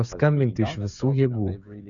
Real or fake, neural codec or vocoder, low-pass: fake; codec, 16 kHz, 8 kbps, FreqCodec, smaller model; 7.2 kHz